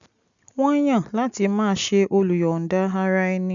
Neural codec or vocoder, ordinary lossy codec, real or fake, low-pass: none; none; real; 7.2 kHz